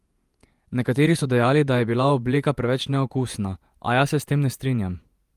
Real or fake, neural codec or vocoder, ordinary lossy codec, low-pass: fake; vocoder, 48 kHz, 128 mel bands, Vocos; Opus, 32 kbps; 14.4 kHz